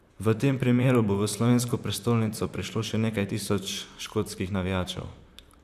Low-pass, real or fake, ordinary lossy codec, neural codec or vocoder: 14.4 kHz; fake; none; vocoder, 44.1 kHz, 128 mel bands, Pupu-Vocoder